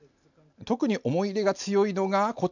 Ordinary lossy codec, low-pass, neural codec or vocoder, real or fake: none; 7.2 kHz; none; real